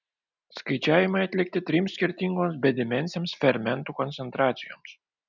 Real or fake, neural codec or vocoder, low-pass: real; none; 7.2 kHz